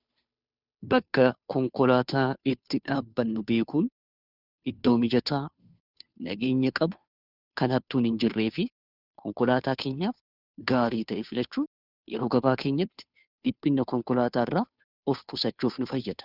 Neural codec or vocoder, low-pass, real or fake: codec, 16 kHz, 2 kbps, FunCodec, trained on Chinese and English, 25 frames a second; 5.4 kHz; fake